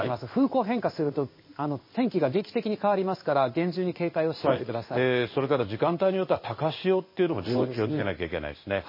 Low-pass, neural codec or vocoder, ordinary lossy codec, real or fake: 5.4 kHz; codec, 16 kHz in and 24 kHz out, 1 kbps, XY-Tokenizer; MP3, 24 kbps; fake